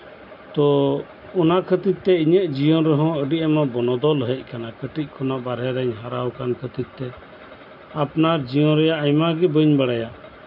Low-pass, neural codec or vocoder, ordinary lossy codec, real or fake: 5.4 kHz; none; none; real